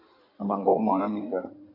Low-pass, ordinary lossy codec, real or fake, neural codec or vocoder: 5.4 kHz; MP3, 48 kbps; fake; codec, 16 kHz in and 24 kHz out, 2.2 kbps, FireRedTTS-2 codec